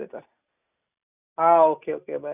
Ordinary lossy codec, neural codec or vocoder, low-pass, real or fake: none; none; 3.6 kHz; real